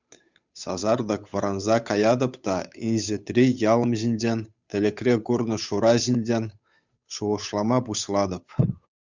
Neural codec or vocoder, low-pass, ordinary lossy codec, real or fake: codec, 16 kHz, 8 kbps, FunCodec, trained on Chinese and English, 25 frames a second; 7.2 kHz; Opus, 64 kbps; fake